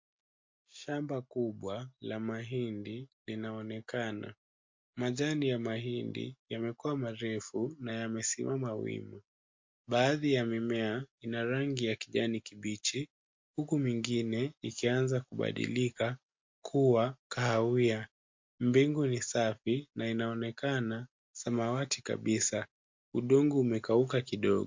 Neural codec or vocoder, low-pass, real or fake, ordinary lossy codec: none; 7.2 kHz; real; MP3, 48 kbps